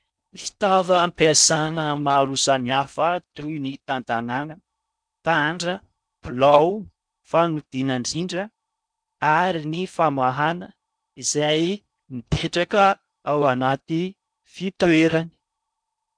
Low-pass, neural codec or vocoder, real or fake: 9.9 kHz; codec, 16 kHz in and 24 kHz out, 0.6 kbps, FocalCodec, streaming, 4096 codes; fake